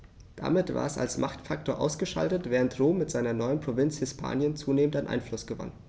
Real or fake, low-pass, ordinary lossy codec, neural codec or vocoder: real; none; none; none